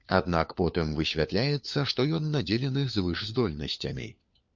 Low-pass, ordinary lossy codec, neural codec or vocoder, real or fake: 7.2 kHz; MP3, 64 kbps; codec, 16 kHz, 4 kbps, FunCodec, trained on LibriTTS, 50 frames a second; fake